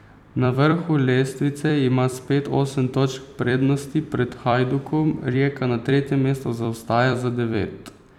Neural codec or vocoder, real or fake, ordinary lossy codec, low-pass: vocoder, 44.1 kHz, 128 mel bands every 256 samples, BigVGAN v2; fake; none; 19.8 kHz